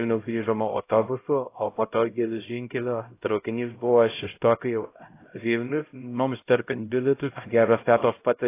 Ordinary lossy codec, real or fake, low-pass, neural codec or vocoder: AAC, 24 kbps; fake; 3.6 kHz; codec, 16 kHz, 0.5 kbps, X-Codec, HuBERT features, trained on LibriSpeech